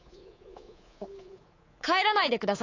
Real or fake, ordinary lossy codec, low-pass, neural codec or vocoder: fake; AAC, 32 kbps; 7.2 kHz; codec, 24 kHz, 3.1 kbps, DualCodec